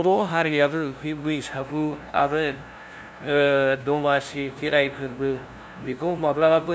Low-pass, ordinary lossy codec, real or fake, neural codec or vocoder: none; none; fake; codec, 16 kHz, 0.5 kbps, FunCodec, trained on LibriTTS, 25 frames a second